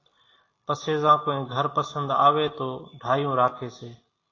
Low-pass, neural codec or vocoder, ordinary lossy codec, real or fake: 7.2 kHz; none; AAC, 32 kbps; real